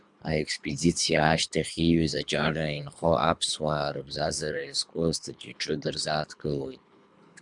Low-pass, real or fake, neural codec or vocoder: 10.8 kHz; fake; codec, 24 kHz, 3 kbps, HILCodec